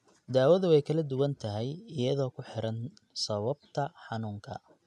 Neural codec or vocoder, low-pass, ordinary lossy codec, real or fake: none; none; none; real